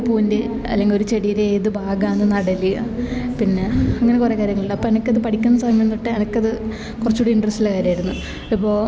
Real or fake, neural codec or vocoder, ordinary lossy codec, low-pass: real; none; none; none